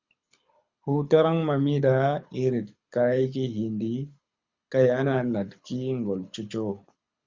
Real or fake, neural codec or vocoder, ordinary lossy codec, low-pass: fake; codec, 24 kHz, 6 kbps, HILCodec; Opus, 64 kbps; 7.2 kHz